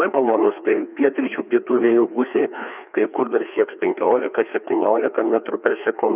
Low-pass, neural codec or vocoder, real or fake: 3.6 kHz; codec, 16 kHz, 2 kbps, FreqCodec, larger model; fake